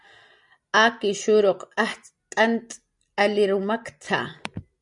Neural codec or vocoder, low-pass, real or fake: none; 10.8 kHz; real